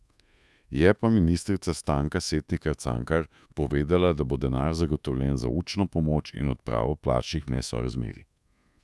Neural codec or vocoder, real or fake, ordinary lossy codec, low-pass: codec, 24 kHz, 1.2 kbps, DualCodec; fake; none; none